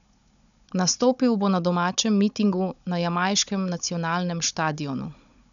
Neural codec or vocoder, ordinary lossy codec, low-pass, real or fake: codec, 16 kHz, 16 kbps, FunCodec, trained on Chinese and English, 50 frames a second; none; 7.2 kHz; fake